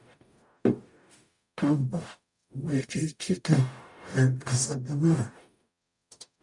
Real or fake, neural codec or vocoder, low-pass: fake; codec, 44.1 kHz, 0.9 kbps, DAC; 10.8 kHz